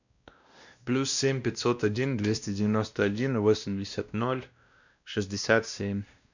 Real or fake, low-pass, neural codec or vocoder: fake; 7.2 kHz; codec, 16 kHz, 1 kbps, X-Codec, WavLM features, trained on Multilingual LibriSpeech